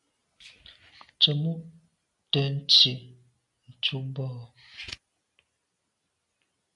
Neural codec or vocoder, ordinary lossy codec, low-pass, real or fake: none; AAC, 64 kbps; 10.8 kHz; real